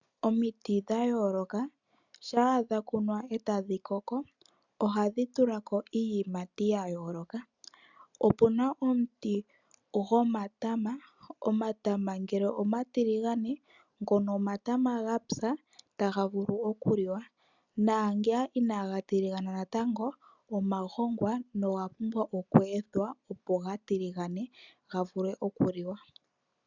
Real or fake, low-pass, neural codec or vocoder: real; 7.2 kHz; none